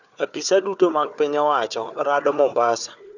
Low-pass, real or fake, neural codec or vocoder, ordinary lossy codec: 7.2 kHz; fake; codec, 16 kHz, 4 kbps, FunCodec, trained on Chinese and English, 50 frames a second; none